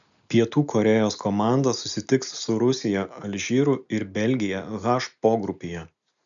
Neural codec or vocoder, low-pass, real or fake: none; 7.2 kHz; real